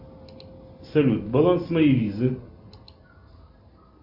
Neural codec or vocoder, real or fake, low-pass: none; real; 5.4 kHz